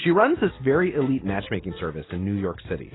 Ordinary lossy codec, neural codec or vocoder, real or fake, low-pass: AAC, 16 kbps; none; real; 7.2 kHz